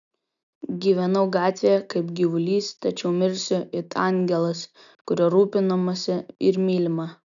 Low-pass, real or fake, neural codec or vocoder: 7.2 kHz; real; none